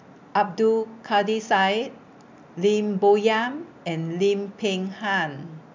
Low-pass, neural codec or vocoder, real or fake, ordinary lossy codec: 7.2 kHz; none; real; MP3, 64 kbps